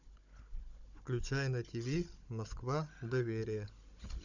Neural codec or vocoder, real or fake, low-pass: codec, 16 kHz, 16 kbps, FunCodec, trained on Chinese and English, 50 frames a second; fake; 7.2 kHz